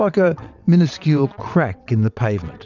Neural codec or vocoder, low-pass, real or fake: vocoder, 22.05 kHz, 80 mel bands, WaveNeXt; 7.2 kHz; fake